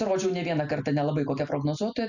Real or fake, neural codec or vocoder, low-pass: real; none; 7.2 kHz